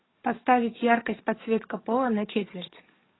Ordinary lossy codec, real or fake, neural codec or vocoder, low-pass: AAC, 16 kbps; fake; codec, 44.1 kHz, 7.8 kbps, DAC; 7.2 kHz